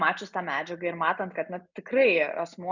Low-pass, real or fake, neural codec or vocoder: 7.2 kHz; real; none